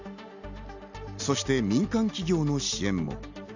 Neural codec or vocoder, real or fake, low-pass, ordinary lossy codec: none; real; 7.2 kHz; none